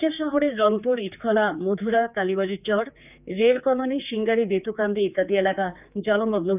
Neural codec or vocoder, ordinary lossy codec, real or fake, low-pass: codec, 16 kHz, 4 kbps, X-Codec, HuBERT features, trained on general audio; none; fake; 3.6 kHz